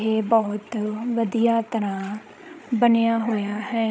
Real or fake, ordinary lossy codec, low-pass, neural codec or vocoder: fake; none; none; codec, 16 kHz, 16 kbps, FreqCodec, larger model